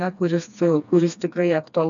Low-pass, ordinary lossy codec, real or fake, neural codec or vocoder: 7.2 kHz; MP3, 96 kbps; fake; codec, 16 kHz, 2 kbps, FreqCodec, smaller model